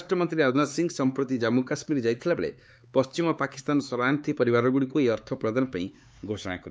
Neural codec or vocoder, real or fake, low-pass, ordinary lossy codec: codec, 16 kHz, 4 kbps, X-Codec, HuBERT features, trained on LibriSpeech; fake; none; none